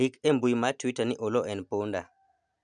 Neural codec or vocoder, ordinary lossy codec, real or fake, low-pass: none; none; real; 9.9 kHz